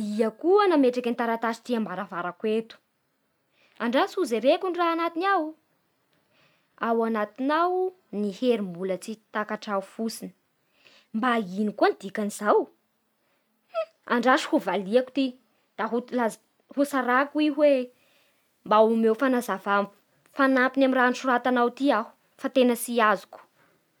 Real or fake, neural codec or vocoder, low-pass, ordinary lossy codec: real; none; 19.8 kHz; none